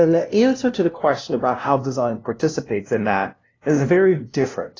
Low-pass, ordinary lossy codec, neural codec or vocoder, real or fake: 7.2 kHz; AAC, 32 kbps; codec, 16 kHz, 0.5 kbps, FunCodec, trained on LibriTTS, 25 frames a second; fake